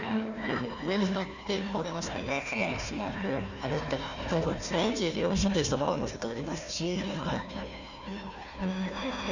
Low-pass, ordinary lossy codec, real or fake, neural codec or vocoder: 7.2 kHz; none; fake; codec, 16 kHz, 1 kbps, FunCodec, trained on Chinese and English, 50 frames a second